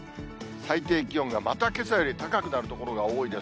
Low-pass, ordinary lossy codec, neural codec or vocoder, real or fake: none; none; none; real